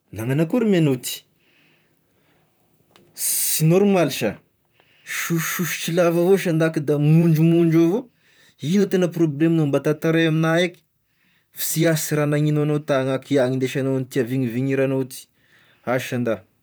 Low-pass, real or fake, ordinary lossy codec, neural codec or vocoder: none; fake; none; vocoder, 44.1 kHz, 128 mel bands, Pupu-Vocoder